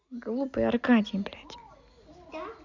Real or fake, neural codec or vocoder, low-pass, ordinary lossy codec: real; none; 7.2 kHz; Opus, 64 kbps